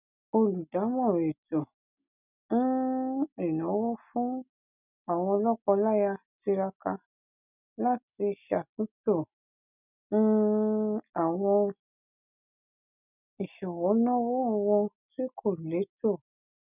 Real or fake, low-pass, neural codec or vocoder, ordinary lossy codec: real; 3.6 kHz; none; none